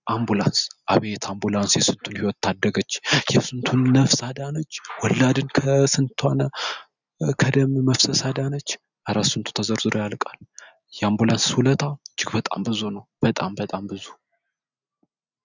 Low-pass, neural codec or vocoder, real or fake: 7.2 kHz; none; real